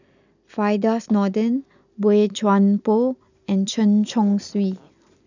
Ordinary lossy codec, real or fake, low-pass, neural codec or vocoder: none; real; 7.2 kHz; none